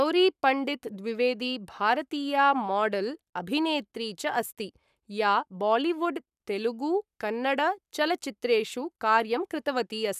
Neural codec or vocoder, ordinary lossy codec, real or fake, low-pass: codec, 44.1 kHz, 7.8 kbps, Pupu-Codec; none; fake; 14.4 kHz